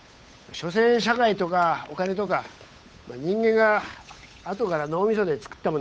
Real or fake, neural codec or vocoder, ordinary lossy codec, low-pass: fake; codec, 16 kHz, 8 kbps, FunCodec, trained on Chinese and English, 25 frames a second; none; none